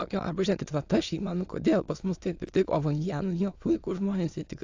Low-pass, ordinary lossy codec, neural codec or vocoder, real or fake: 7.2 kHz; AAC, 48 kbps; autoencoder, 22.05 kHz, a latent of 192 numbers a frame, VITS, trained on many speakers; fake